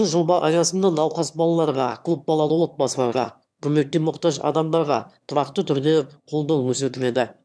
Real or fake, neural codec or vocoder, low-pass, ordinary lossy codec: fake; autoencoder, 22.05 kHz, a latent of 192 numbers a frame, VITS, trained on one speaker; none; none